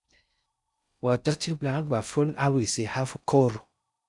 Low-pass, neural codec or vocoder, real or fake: 10.8 kHz; codec, 16 kHz in and 24 kHz out, 0.6 kbps, FocalCodec, streaming, 4096 codes; fake